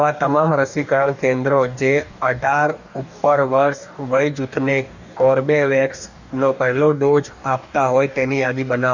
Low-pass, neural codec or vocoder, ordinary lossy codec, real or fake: 7.2 kHz; codec, 44.1 kHz, 2.6 kbps, DAC; none; fake